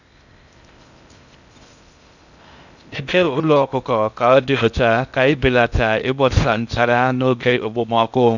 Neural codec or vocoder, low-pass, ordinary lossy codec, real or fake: codec, 16 kHz in and 24 kHz out, 0.6 kbps, FocalCodec, streaming, 2048 codes; 7.2 kHz; none; fake